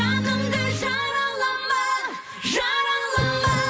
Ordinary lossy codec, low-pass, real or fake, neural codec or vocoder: none; none; real; none